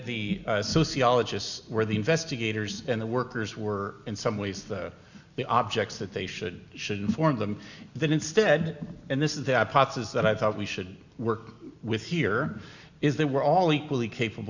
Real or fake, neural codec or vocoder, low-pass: real; none; 7.2 kHz